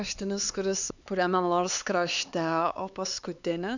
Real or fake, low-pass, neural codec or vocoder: fake; 7.2 kHz; codec, 16 kHz, 4 kbps, X-Codec, HuBERT features, trained on LibriSpeech